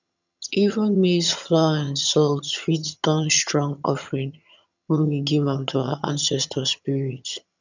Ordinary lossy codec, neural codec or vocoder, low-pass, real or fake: none; vocoder, 22.05 kHz, 80 mel bands, HiFi-GAN; 7.2 kHz; fake